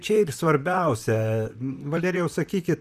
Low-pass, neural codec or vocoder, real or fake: 14.4 kHz; vocoder, 44.1 kHz, 128 mel bands, Pupu-Vocoder; fake